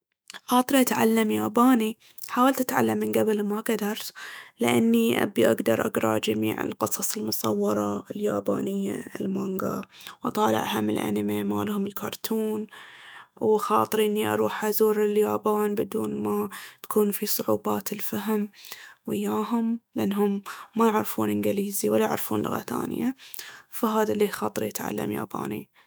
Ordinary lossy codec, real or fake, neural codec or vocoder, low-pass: none; fake; autoencoder, 48 kHz, 128 numbers a frame, DAC-VAE, trained on Japanese speech; none